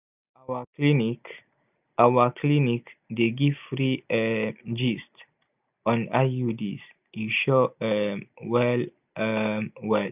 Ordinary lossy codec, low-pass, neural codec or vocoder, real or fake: none; 3.6 kHz; none; real